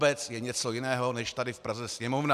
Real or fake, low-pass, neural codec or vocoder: real; 14.4 kHz; none